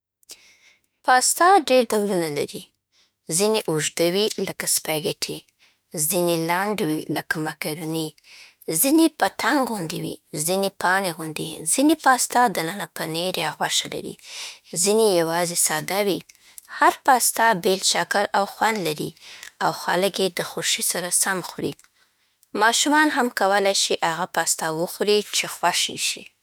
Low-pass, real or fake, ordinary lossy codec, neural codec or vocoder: none; fake; none; autoencoder, 48 kHz, 32 numbers a frame, DAC-VAE, trained on Japanese speech